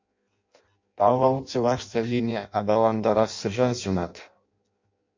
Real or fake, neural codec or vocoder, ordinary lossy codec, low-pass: fake; codec, 16 kHz in and 24 kHz out, 0.6 kbps, FireRedTTS-2 codec; MP3, 48 kbps; 7.2 kHz